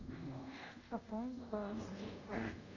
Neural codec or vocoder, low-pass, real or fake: codec, 24 kHz, 0.5 kbps, DualCodec; 7.2 kHz; fake